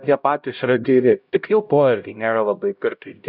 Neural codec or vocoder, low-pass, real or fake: codec, 16 kHz, 0.5 kbps, X-Codec, HuBERT features, trained on LibriSpeech; 5.4 kHz; fake